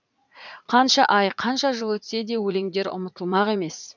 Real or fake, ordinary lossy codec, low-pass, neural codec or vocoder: fake; none; 7.2 kHz; vocoder, 44.1 kHz, 80 mel bands, Vocos